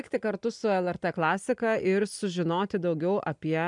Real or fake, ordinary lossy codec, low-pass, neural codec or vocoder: real; MP3, 96 kbps; 10.8 kHz; none